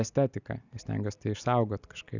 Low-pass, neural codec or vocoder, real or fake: 7.2 kHz; none; real